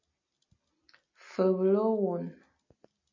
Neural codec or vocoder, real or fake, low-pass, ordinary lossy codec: none; real; 7.2 kHz; MP3, 32 kbps